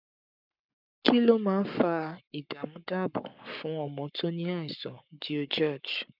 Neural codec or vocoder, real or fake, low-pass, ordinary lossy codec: codec, 44.1 kHz, 7.8 kbps, Pupu-Codec; fake; 5.4 kHz; none